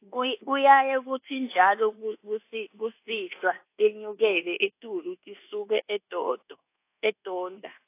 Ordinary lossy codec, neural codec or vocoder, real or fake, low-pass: AAC, 24 kbps; codec, 24 kHz, 1.2 kbps, DualCodec; fake; 3.6 kHz